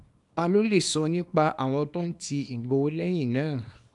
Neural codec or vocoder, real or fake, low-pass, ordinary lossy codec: codec, 24 kHz, 0.9 kbps, WavTokenizer, small release; fake; 10.8 kHz; MP3, 96 kbps